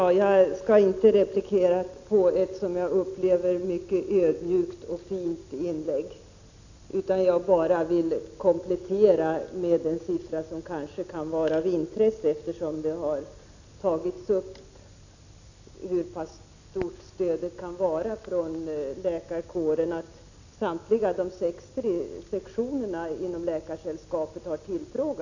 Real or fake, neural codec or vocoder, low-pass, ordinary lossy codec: real; none; 7.2 kHz; none